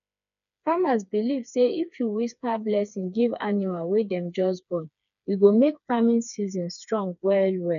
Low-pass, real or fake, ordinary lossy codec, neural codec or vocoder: 7.2 kHz; fake; none; codec, 16 kHz, 4 kbps, FreqCodec, smaller model